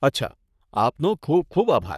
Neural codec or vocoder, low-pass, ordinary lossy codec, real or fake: codec, 44.1 kHz, 3.4 kbps, Pupu-Codec; 14.4 kHz; none; fake